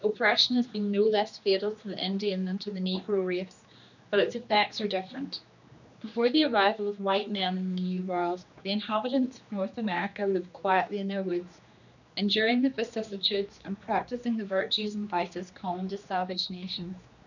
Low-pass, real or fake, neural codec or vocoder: 7.2 kHz; fake; codec, 16 kHz, 2 kbps, X-Codec, HuBERT features, trained on general audio